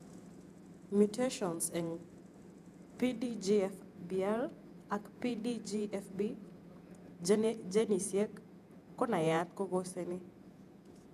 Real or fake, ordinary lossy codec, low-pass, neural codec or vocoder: fake; none; 14.4 kHz; vocoder, 48 kHz, 128 mel bands, Vocos